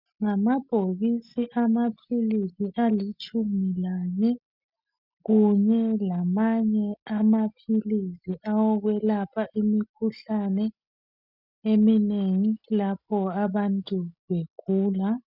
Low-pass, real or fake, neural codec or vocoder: 5.4 kHz; real; none